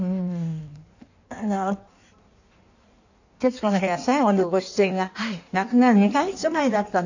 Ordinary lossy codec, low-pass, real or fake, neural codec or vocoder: none; 7.2 kHz; fake; codec, 16 kHz in and 24 kHz out, 1.1 kbps, FireRedTTS-2 codec